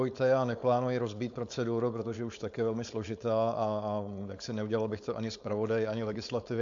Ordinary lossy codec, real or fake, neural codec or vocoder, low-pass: AAC, 64 kbps; fake; codec, 16 kHz, 4.8 kbps, FACodec; 7.2 kHz